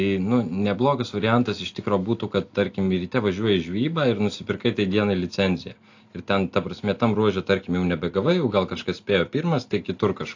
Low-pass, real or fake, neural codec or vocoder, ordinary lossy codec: 7.2 kHz; real; none; AAC, 48 kbps